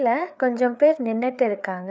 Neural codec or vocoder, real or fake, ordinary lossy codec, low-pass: codec, 16 kHz, 4 kbps, FreqCodec, larger model; fake; none; none